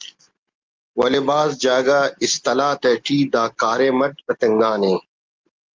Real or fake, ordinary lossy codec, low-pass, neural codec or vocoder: real; Opus, 24 kbps; 7.2 kHz; none